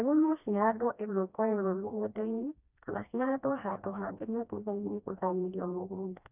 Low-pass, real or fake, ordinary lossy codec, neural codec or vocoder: 3.6 kHz; fake; none; codec, 16 kHz, 1 kbps, FreqCodec, smaller model